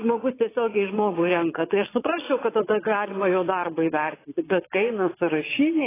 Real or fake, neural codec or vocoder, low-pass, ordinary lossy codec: fake; vocoder, 22.05 kHz, 80 mel bands, Vocos; 3.6 kHz; AAC, 16 kbps